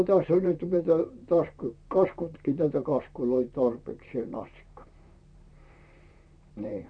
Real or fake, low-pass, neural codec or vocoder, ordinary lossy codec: fake; 9.9 kHz; vocoder, 44.1 kHz, 128 mel bands, Pupu-Vocoder; none